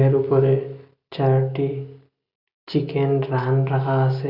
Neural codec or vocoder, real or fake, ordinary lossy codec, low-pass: none; real; none; 5.4 kHz